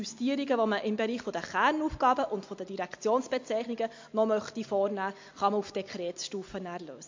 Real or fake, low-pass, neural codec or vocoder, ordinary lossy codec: real; 7.2 kHz; none; MP3, 48 kbps